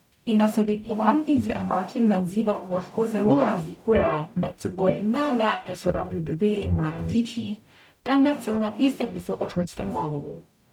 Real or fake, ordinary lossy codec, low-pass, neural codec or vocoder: fake; none; 19.8 kHz; codec, 44.1 kHz, 0.9 kbps, DAC